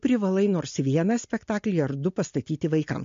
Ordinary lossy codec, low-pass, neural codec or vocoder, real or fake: MP3, 48 kbps; 7.2 kHz; none; real